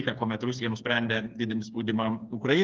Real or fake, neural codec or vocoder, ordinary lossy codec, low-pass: fake; codec, 16 kHz, 4 kbps, FreqCodec, smaller model; Opus, 32 kbps; 7.2 kHz